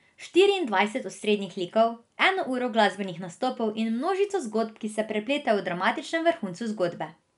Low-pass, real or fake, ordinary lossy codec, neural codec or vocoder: 10.8 kHz; real; none; none